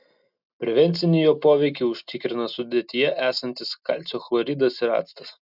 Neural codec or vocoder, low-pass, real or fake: none; 5.4 kHz; real